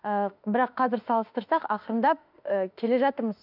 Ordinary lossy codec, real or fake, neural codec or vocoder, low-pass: none; fake; autoencoder, 48 kHz, 32 numbers a frame, DAC-VAE, trained on Japanese speech; 5.4 kHz